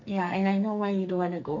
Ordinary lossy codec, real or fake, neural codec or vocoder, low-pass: none; fake; codec, 44.1 kHz, 2.6 kbps, SNAC; 7.2 kHz